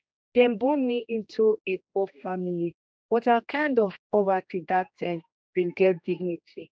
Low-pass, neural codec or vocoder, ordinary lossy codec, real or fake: none; codec, 16 kHz, 1 kbps, X-Codec, HuBERT features, trained on general audio; none; fake